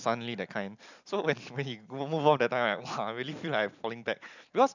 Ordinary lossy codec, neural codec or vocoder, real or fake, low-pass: none; none; real; 7.2 kHz